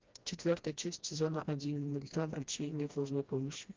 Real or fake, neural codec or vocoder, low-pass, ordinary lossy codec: fake; codec, 16 kHz, 1 kbps, FreqCodec, smaller model; 7.2 kHz; Opus, 32 kbps